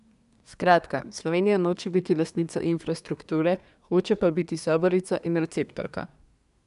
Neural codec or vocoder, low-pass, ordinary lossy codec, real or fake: codec, 24 kHz, 1 kbps, SNAC; 10.8 kHz; none; fake